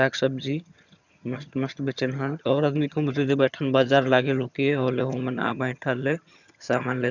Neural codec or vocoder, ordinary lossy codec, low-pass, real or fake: vocoder, 22.05 kHz, 80 mel bands, HiFi-GAN; none; 7.2 kHz; fake